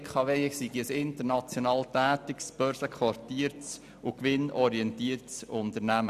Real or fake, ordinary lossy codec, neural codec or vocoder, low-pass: real; none; none; 14.4 kHz